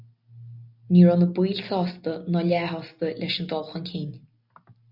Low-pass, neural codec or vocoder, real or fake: 5.4 kHz; none; real